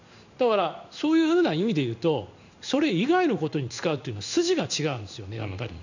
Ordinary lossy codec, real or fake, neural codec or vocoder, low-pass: none; fake; codec, 16 kHz in and 24 kHz out, 1 kbps, XY-Tokenizer; 7.2 kHz